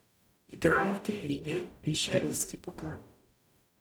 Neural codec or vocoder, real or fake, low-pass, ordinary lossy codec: codec, 44.1 kHz, 0.9 kbps, DAC; fake; none; none